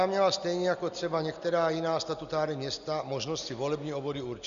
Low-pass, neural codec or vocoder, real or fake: 7.2 kHz; none; real